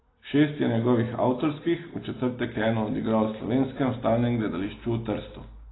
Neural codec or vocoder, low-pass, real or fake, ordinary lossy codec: none; 7.2 kHz; real; AAC, 16 kbps